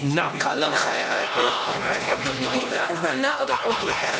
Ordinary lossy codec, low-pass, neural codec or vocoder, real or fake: none; none; codec, 16 kHz, 1 kbps, X-Codec, HuBERT features, trained on LibriSpeech; fake